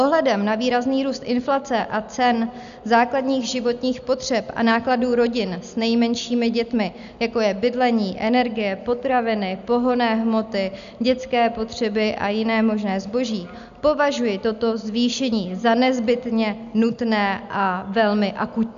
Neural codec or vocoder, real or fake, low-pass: none; real; 7.2 kHz